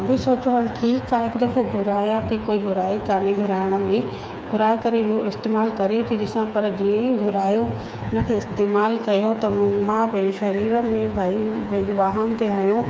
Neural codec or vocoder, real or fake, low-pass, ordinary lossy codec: codec, 16 kHz, 4 kbps, FreqCodec, smaller model; fake; none; none